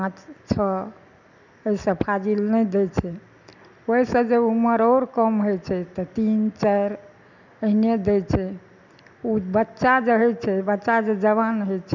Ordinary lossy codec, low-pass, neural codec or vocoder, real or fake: none; 7.2 kHz; none; real